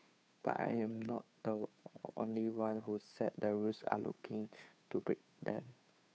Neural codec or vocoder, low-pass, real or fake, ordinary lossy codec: codec, 16 kHz, 2 kbps, FunCodec, trained on Chinese and English, 25 frames a second; none; fake; none